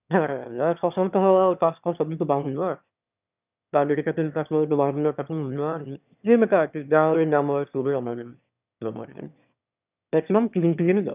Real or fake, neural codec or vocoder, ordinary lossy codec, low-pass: fake; autoencoder, 22.05 kHz, a latent of 192 numbers a frame, VITS, trained on one speaker; none; 3.6 kHz